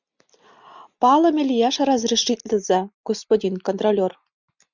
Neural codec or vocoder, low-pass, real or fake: none; 7.2 kHz; real